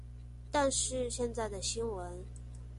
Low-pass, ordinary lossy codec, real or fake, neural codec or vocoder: 14.4 kHz; MP3, 48 kbps; real; none